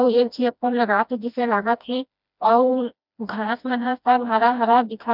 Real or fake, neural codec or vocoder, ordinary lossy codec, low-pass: fake; codec, 16 kHz, 1 kbps, FreqCodec, smaller model; none; 5.4 kHz